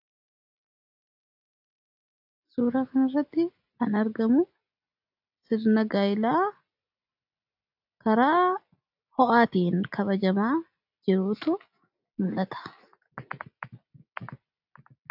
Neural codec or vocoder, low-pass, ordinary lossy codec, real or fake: none; 5.4 kHz; AAC, 48 kbps; real